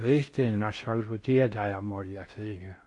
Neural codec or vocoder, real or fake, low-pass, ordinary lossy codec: codec, 16 kHz in and 24 kHz out, 0.6 kbps, FocalCodec, streaming, 4096 codes; fake; 10.8 kHz; MP3, 48 kbps